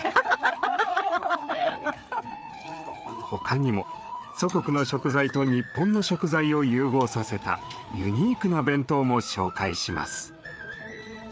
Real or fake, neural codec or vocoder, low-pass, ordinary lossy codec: fake; codec, 16 kHz, 4 kbps, FreqCodec, larger model; none; none